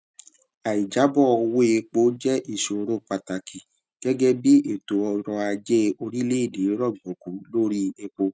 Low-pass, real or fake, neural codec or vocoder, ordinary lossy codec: none; real; none; none